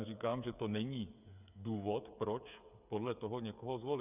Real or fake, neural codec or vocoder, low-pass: fake; codec, 16 kHz, 8 kbps, FreqCodec, smaller model; 3.6 kHz